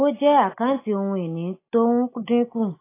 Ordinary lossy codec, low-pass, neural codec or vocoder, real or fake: AAC, 24 kbps; 3.6 kHz; none; real